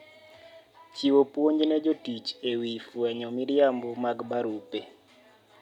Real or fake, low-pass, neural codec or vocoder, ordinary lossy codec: real; 19.8 kHz; none; none